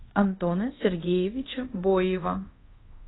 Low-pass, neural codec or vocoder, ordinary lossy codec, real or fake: 7.2 kHz; codec, 24 kHz, 0.5 kbps, DualCodec; AAC, 16 kbps; fake